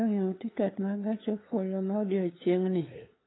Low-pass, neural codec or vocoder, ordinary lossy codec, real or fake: 7.2 kHz; codec, 16 kHz, 8 kbps, FunCodec, trained on LibriTTS, 25 frames a second; AAC, 16 kbps; fake